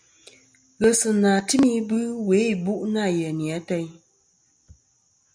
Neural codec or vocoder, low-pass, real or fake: none; 9.9 kHz; real